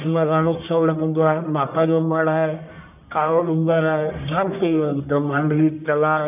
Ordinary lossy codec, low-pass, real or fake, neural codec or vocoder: none; 3.6 kHz; fake; codec, 44.1 kHz, 1.7 kbps, Pupu-Codec